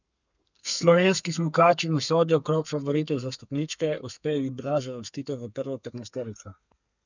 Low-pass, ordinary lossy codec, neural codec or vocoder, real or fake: 7.2 kHz; none; codec, 32 kHz, 1.9 kbps, SNAC; fake